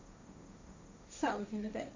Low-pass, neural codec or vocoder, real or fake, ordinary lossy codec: 7.2 kHz; codec, 16 kHz, 1.1 kbps, Voila-Tokenizer; fake; AAC, 48 kbps